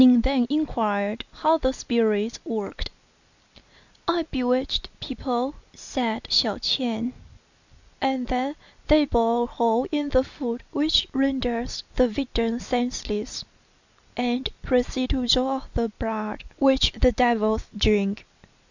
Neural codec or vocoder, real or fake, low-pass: none; real; 7.2 kHz